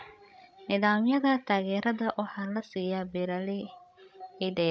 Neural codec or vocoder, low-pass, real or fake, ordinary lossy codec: codec, 16 kHz, 16 kbps, FreqCodec, larger model; none; fake; none